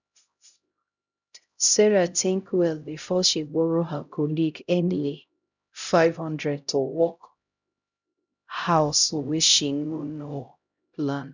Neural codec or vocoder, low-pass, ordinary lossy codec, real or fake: codec, 16 kHz, 0.5 kbps, X-Codec, HuBERT features, trained on LibriSpeech; 7.2 kHz; none; fake